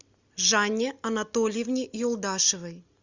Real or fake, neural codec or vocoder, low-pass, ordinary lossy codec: real; none; 7.2 kHz; Opus, 64 kbps